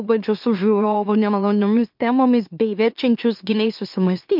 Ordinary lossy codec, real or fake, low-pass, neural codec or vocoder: MP3, 48 kbps; fake; 5.4 kHz; autoencoder, 44.1 kHz, a latent of 192 numbers a frame, MeloTTS